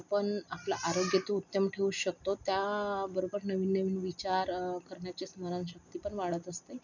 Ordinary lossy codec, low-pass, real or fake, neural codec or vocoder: none; 7.2 kHz; real; none